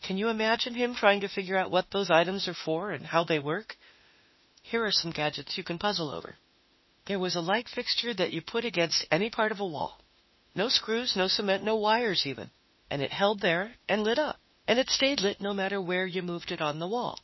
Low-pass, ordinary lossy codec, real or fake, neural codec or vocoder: 7.2 kHz; MP3, 24 kbps; fake; autoencoder, 48 kHz, 32 numbers a frame, DAC-VAE, trained on Japanese speech